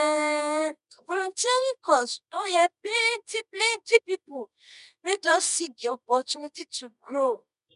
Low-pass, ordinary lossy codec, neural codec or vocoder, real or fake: 10.8 kHz; none; codec, 24 kHz, 0.9 kbps, WavTokenizer, medium music audio release; fake